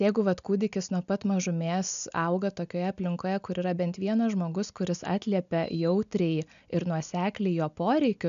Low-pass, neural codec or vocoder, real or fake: 7.2 kHz; none; real